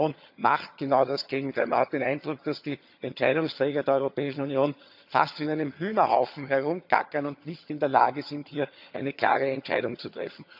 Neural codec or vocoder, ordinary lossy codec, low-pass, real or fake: vocoder, 22.05 kHz, 80 mel bands, HiFi-GAN; none; 5.4 kHz; fake